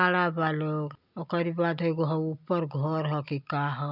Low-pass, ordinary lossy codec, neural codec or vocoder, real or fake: 5.4 kHz; none; none; real